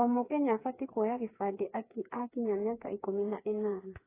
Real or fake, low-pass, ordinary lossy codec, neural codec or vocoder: fake; 3.6 kHz; AAC, 16 kbps; codec, 16 kHz, 4 kbps, FreqCodec, smaller model